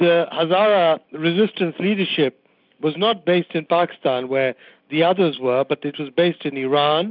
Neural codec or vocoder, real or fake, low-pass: none; real; 5.4 kHz